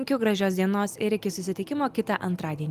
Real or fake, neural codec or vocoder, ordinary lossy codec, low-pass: real; none; Opus, 32 kbps; 14.4 kHz